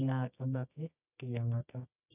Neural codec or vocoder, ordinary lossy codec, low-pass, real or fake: codec, 24 kHz, 0.9 kbps, WavTokenizer, medium music audio release; none; 3.6 kHz; fake